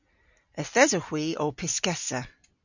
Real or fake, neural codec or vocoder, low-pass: real; none; 7.2 kHz